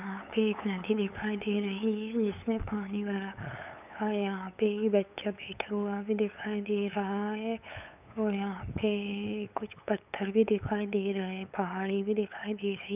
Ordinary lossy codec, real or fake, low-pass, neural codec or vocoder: none; fake; 3.6 kHz; codec, 16 kHz, 8 kbps, FunCodec, trained on LibriTTS, 25 frames a second